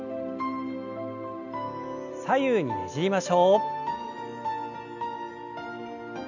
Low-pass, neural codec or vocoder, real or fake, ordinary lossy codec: 7.2 kHz; none; real; none